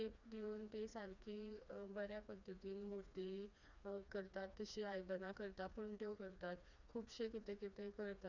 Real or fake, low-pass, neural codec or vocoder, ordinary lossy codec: fake; 7.2 kHz; codec, 16 kHz, 2 kbps, FreqCodec, smaller model; none